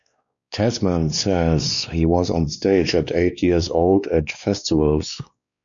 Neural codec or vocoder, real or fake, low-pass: codec, 16 kHz, 2 kbps, X-Codec, WavLM features, trained on Multilingual LibriSpeech; fake; 7.2 kHz